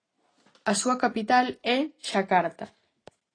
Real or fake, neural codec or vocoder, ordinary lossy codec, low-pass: real; none; AAC, 32 kbps; 9.9 kHz